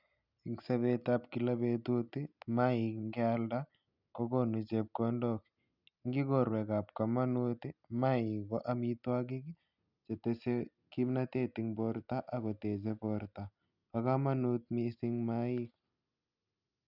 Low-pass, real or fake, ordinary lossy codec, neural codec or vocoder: 5.4 kHz; real; none; none